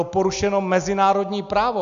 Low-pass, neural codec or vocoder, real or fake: 7.2 kHz; none; real